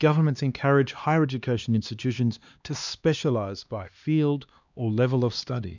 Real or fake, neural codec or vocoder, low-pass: fake; codec, 16 kHz, 2 kbps, X-Codec, HuBERT features, trained on LibriSpeech; 7.2 kHz